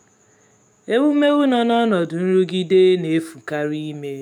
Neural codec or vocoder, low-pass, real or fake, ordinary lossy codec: autoencoder, 48 kHz, 128 numbers a frame, DAC-VAE, trained on Japanese speech; 19.8 kHz; fake; none